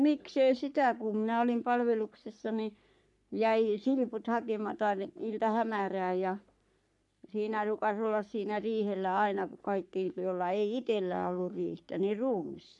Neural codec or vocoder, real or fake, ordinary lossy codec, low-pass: codec, 44.1 kHz, 3.4 kbps, Pupu-Codec; fake; none; 10.8 kHz